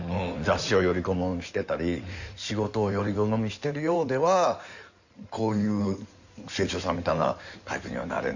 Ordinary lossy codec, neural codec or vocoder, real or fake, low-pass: none; codec, 16 kHz in and 24 kHz out, 2.2 kbps, FireRedTTS-2 codec; fake; 7.2 kHz